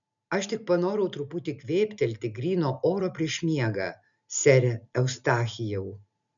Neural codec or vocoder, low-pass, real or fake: none; 7.2 kHz; real